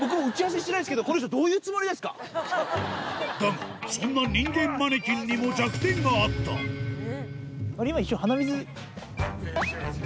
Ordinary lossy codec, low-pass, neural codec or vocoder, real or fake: none; none; none; real